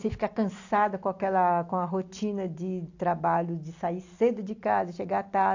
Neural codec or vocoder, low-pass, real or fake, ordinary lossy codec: none; 7.2 kHz; real; AAC, 48 kbps